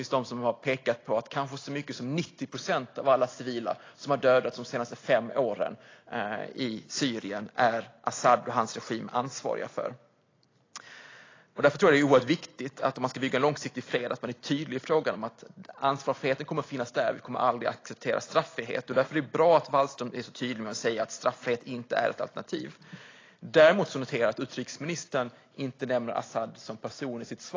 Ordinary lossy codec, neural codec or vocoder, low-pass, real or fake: AAC, 32 kbps; none; 7.2 kHz; real